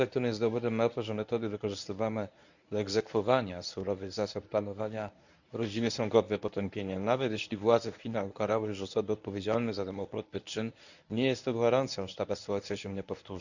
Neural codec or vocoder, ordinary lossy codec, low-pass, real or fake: codec, 24 kHz, 0.9 kbps, WavTokenizer, medium speech release version 1; none; 7.2 kHz; fake